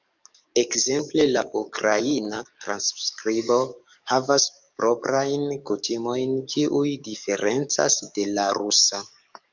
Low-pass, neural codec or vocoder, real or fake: 7.2 kHz; codec, 44.1 kHz, 7.8 kbps, DAC; fake